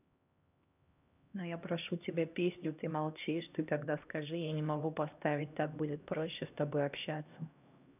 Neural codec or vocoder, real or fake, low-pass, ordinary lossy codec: codec, 16 kHz, 1 kbps, X-Codec, HuBERT features, trained on LibriSpeech; fake; 3.6 kHz; none